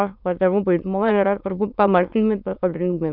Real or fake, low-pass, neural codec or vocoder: fake; 5.4 kHz; autoencoder, 22.05 kHz, a latent of 192 numbers a frame, VITS, trained on many speakers